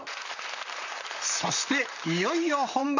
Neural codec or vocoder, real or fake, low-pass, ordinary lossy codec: vocoder, 44.1 kHz, 128 mel bands, Pupu-Vocoder; fake; 7.2 kHz; none